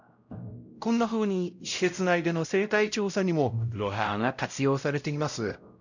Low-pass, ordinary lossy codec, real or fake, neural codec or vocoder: 7.2 kHz; none; fake; codec, 16 kHz, 0.5 kbps, X-Codec, WavLM features, trained on Multilingual LibriSpeech